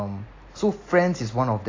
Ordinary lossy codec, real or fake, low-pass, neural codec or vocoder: AAC, 32 kbps; real; 7.2 kHz; none